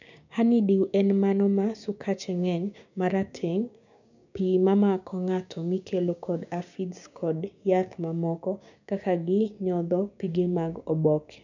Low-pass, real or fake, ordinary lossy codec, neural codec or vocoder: 7.2 kHz; fake; none; codec, 16 kHz, 6 kbps, DAC